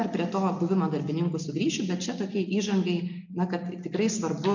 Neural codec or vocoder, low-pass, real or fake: none; 7.2 kHz; real